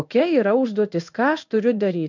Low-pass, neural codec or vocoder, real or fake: 7.2 kHz; codec, 16 kHz in and 24 kHz out, 1 kbps, XY-Tokenizer; fake